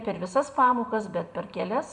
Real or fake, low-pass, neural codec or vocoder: fake; 10.8 kHz; vocoder, 44.1 kHz, 128 mel bands every 512 samples, BigVGAN v2